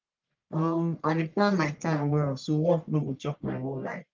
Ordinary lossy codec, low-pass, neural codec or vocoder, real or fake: Opus, 32 kbps; 7.2 kHz; codec, 44.1 kHz, 1.7 kbps, Pupu-Codec; fake